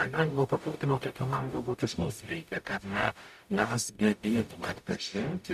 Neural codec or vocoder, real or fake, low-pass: codec, 44.1 kHz, 0.9 kbps, DAC; fake; 14.4 kHz